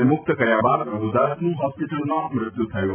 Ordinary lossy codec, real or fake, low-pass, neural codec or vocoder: none; real; 3.6 kHz; none